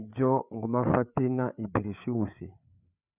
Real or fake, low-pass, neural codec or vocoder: fake; 3.6 kHz; codec, 16 kHz, 4 kbps, FreqCodec, larger model